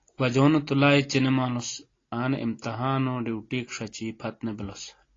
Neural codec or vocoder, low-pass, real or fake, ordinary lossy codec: none; 7.2 kHz; real; AAC, 32 kbps